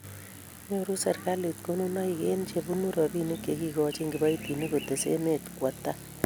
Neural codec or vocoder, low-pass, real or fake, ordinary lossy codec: none; none; real; none